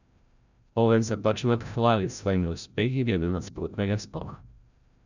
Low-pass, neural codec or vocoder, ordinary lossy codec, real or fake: 7.2 kHz; codec, 16 kHz, 0.5 kbps, FreqCodec, larger model; none; fake